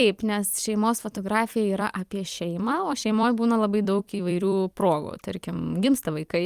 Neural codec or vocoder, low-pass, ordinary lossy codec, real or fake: vocoder, 44.1 kHz, 128 mel bands every 256 samples, BigVGAN v2; 14.4 kHz; Opus, 32 kbps; fake